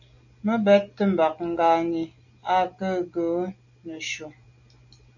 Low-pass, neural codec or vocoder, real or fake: 7.2 kHz; none; real